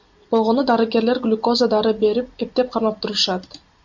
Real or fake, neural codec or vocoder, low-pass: real; none; 7.2 kHz